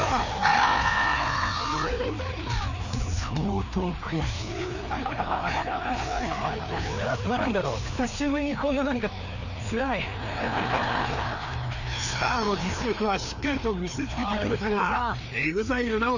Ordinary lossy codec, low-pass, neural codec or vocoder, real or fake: none; 7.2 kHz; codec, 16 kHz, 2 kbps, FreqCodec, larger model; fake